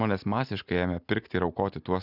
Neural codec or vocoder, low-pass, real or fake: none; 5.4 kHz; real